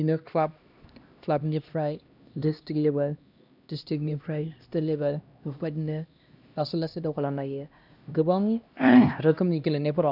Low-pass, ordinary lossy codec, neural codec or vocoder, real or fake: 5.4 kHz; none; codec, 16 kHz, 1 kbps, X-Codec, HuBERT features, trained on LibriSpeech; fake